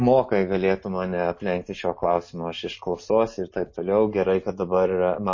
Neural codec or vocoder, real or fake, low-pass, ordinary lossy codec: none; real; 7.2 kHz; MP3, 32 kbps